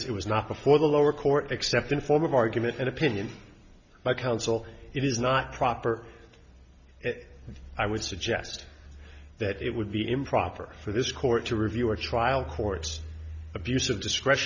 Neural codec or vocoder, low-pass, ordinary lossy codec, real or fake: none; 7.2 kHz; Opus, 64 kbps; real